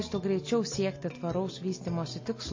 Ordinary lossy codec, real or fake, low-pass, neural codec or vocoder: MP3, 32 kbps; real; 7.2 kHz; none